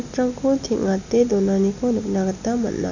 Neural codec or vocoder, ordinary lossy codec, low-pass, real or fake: none; none; 7.2 kHz; real